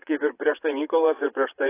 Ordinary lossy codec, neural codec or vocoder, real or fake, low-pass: AAC, 16 kbps; vocoder, 22.05 kHz, 80 mel bands, Vocos; fake; 3.6 kHz